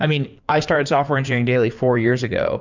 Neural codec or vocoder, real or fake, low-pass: codec, 16 kHz in and 24 kHz out, 2.2 kbps, FireRedTTS-2 codec; fake; 7.2 kHz